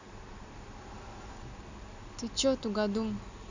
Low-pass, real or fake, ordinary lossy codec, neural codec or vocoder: 7.2 kHz; real; AAC, 48 kbps; none